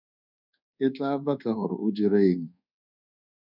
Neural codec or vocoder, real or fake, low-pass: codec, 24 kHz, 1.2 kbps, DualCodec; fake; 5.4 kHz